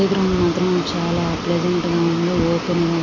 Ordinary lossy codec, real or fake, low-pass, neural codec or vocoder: AAC, 32 kbps; real; 7.2 kHz; none